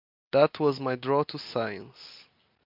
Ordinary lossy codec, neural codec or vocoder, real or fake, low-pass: MP3, 48 kbps; none; real; 5.4 kHz